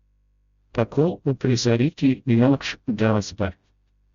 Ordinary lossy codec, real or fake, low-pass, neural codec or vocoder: Opus, 64 kbps; fake; 7.2 kHz; codec, 16 kHz, 0.5 kbps, FreqCodec, smaller model